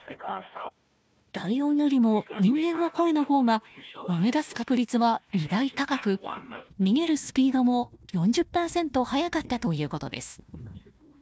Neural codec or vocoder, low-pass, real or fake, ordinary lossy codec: codec, 16 kHz, 1 kbps, FunCodec, trained on Chinese and English, 50 frames a second; none; fake; none